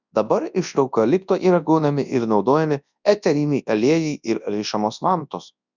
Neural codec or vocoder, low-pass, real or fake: codec, 24 kHz, 0.9 kbps, WavTokenizer, large speech release; 7.2 kHz; fake